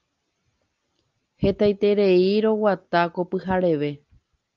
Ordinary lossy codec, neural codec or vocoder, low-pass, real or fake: Opus, 24 kbps; none; 7.2 kHz; real